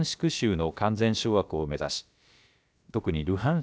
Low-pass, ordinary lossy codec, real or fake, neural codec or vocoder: none; none; fake; codec, 16 kHz, about 1 kbps, DyCAST, with the encoder's durations